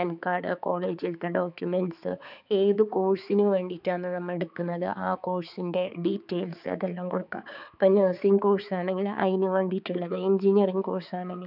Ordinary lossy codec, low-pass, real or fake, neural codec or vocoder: none; 5.4 kHz; fake; codec, 16 kHz, 4 kbps, X-Codec, HuBERT features, trained on balanced general audio